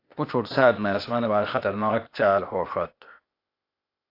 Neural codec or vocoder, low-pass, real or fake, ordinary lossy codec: codec, 16 kHz, 0.8 kbps, ZipCodec; 5.4 kHz; fake; AAC, 32 kbps